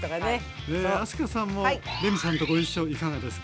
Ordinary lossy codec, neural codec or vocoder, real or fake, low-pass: none; none; real; none